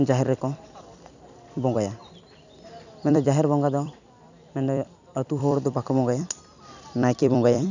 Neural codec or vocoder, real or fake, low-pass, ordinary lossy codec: vocoder, 44.1 kHz, 128 mel bands every 256 samples, BigVGAN v2; fake; 7.2 kHz; none